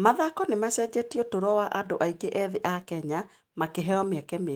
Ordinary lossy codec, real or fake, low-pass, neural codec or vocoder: Opus, 24 kbps; fake; 19.8 kHz; autoencoder, 48 kHz, 128 numbers a frame, DAC-VAE, trained on Japanese speech